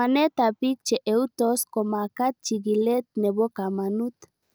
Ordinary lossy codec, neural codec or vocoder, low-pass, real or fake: none; none; none; real